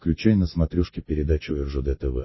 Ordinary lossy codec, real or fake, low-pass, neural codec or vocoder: MP3, 24 kbps; real; 7.2 kHz; none